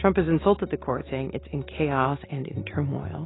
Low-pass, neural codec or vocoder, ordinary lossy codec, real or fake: 7.2 kHz; none; AAC, 16 kbps; real